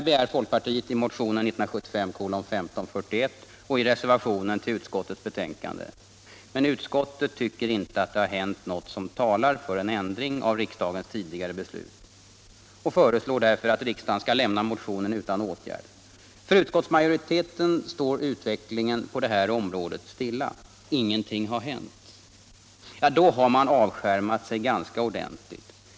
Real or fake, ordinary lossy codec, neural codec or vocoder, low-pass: real; none; none; none